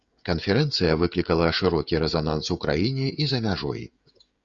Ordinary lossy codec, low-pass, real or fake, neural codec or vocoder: Opus, 64 kbps; 7.2 kHz; fake; codec, 16 kHz, 16 kbps, FreqCodec, smaller model